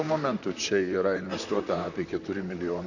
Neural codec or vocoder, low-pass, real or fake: vocoder, 44.1 kHz, 128 mel bands, Pupu-Vocoder; 7.2 kHz; fake